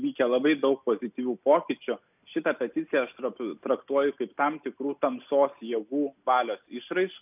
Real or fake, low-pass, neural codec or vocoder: real; 3.6 kHz; none